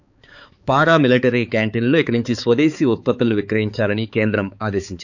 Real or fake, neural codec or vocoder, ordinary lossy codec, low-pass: fake; codec, 16 kHz, 4 kbps, X-Codec, HuBERT features, trained on balanced general audio; none; 7.2 kHz